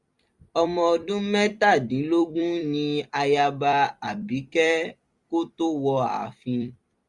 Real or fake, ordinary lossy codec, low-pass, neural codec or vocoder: fake; Opus, 64 kbps; 10.8 kHz; vocoder, 44.1 kHz, 128 mel bands every 256 samples, BigVGAN v2